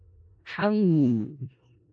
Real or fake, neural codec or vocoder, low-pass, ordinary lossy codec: fake; codec, 16 kHz in and 24 kHz out, 0.4 kbps, LongCat-Audio-Codec, four codebook decoder; 9.9 kHz; MP3, 48 kbps